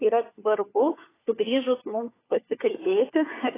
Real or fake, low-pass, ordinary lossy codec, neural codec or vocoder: fake; 3.6 kHz; AAC, 16 kbps; codec, 16 kHz, 4 kbps, FunCodec, trained on LibriTTS, 50 frames a second